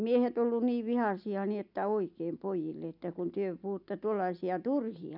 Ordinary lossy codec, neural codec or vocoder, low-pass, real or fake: none; none; 5.4 kHz; real